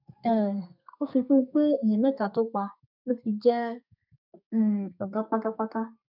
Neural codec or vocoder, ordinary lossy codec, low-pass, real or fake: codec, 32 kHz, 1.9 kbps, SNAC; none; 5.4 kHz; fake